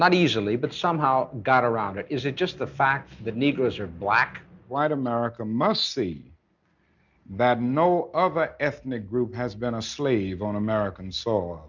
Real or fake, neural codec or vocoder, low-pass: real; none; 7.2 kHz